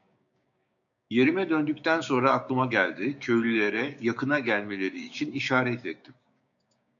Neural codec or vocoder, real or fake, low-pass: codec, 16 kHz, 6 kbps, DAC; fake; 7.2 kHz